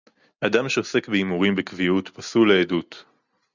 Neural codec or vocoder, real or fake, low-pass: none; real; 7.2 kHz